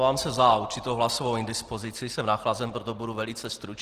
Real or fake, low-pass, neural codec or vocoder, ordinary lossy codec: real; 14.4 kHz; none; Opus, 16 kbps